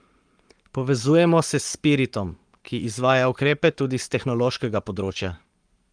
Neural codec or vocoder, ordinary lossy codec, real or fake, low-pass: codec, 44.1 kHz, 7.8 kbps, Pupu-Codec; Opus, 32 kbps; fake; 9.9 kHz